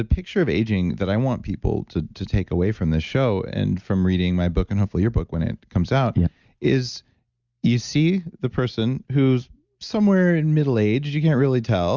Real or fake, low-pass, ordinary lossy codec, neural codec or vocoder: real; 7.2 kHz; Opus, 64 kbps; none